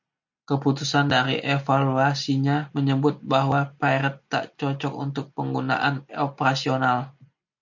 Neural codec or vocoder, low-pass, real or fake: none; 7.2 kHz; real